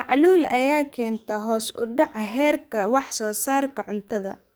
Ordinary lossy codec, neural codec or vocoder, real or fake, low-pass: none; codec, 44.1 kHz, 2.6 kbps, SNAC; fake; none